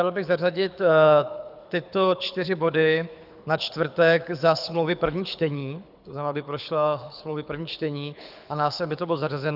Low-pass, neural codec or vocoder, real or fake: 5.4 kHz; codec, 24 kHz, 6 kbps, HILCodec; fake